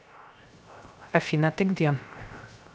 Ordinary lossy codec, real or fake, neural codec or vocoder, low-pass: none; fake; codec, 16 kHz, 0.3 kbps, FocalCodec; none